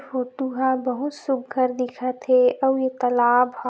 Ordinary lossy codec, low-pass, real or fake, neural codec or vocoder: none; none; real; none